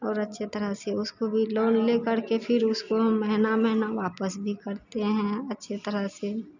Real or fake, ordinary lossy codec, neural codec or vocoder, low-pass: real; none; none; 7.2 kHz